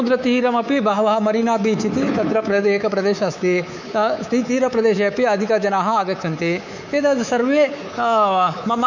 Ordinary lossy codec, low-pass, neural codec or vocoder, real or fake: none; 7.2 kHz; codec, 24 kHz, 3.1 kbps, DualCodec; fake